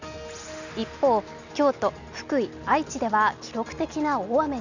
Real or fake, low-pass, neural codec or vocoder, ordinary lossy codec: real; 7.2 kHz; none; none